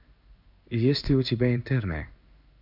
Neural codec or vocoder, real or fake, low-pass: codec, 16 kHz in and 24 kHz out, 1 kbps, XY-Tokenizer; fake; 5.4 kHz